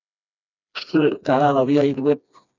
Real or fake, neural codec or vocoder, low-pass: fake; codec, 16 kHz, 2 kbps, FreqCodec, smaller model; 7.2 kHz